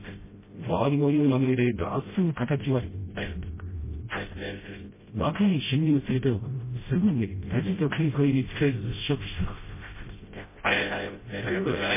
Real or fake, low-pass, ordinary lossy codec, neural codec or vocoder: fake; 3.6 kHz; MP3, 16 kbps; codec, 16 kHz, 0.5 kbps, FreqCodec, smaller model